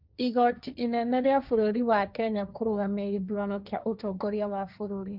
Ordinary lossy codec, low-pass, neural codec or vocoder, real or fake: none; 5.4 kHz; codec, 16 kHz, 1.1 kbps, Voila-Tokenizer; fake